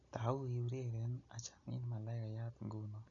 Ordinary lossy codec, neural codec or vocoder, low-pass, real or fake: none; none; 7.2 kHz; real